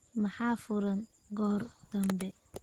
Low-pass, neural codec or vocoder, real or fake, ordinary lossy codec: 19.8 kHz; none; real; Opus, 16 kbps